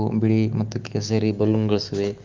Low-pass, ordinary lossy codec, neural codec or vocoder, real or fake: 7.2 kHz; Opus, 32 kbps; autoencoder, 48 kHz, 128 numbers a frame, DAC-VAE, trained on Japanese speech; fake